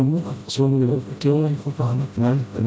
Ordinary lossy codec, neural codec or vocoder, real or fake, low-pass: none; codec, 16 kHz, 0.5 kbps, FreqCodec, smaller model; fake; none